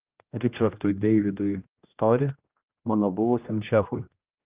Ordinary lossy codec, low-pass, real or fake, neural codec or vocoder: Opus, 24 kbps; 3.6 kHz; fake; codec, 16 kHz, 1 kbps, X-Codec, HuBERT features, trained on general audio